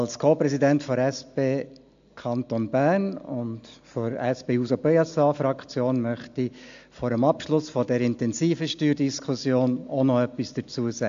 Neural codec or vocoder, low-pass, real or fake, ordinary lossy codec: none; 7.2 kHz; real; AAC, 64 kbps